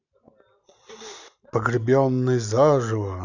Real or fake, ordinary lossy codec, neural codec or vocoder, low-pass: real; none; none; 7.2 kHz